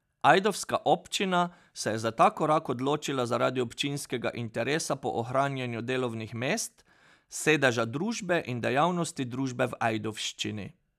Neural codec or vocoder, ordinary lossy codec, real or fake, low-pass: none; none; real; 14.4 kHz